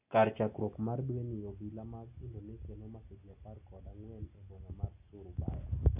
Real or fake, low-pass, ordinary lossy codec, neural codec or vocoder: real; 3.6 kHz; none; none